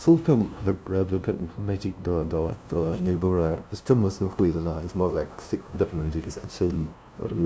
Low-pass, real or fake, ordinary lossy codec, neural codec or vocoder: none; fake; none; codec, 16 kHz, 0.5 kbps, FunCodec, trained on LibriTTS, 25 frames a second